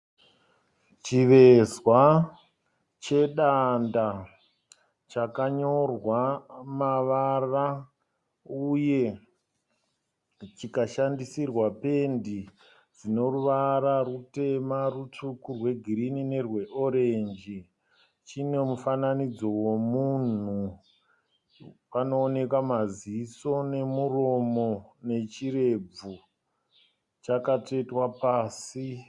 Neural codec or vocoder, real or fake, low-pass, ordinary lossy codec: none; real; 10.8 kHz; Opus, 64 kbps